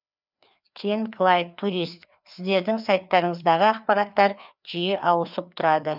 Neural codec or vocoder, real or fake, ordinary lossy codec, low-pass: codec, 16 kHz, 2 kbps, FreqCodec, larger model; fake; none; 5.4 kHz